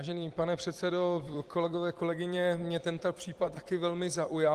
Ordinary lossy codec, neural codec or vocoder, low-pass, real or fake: Opus, 24 kbps; vocoder, 44.1 kHz, 128 mel bands every 256 samples, BigVGAN v2; 14.4 kHz; fake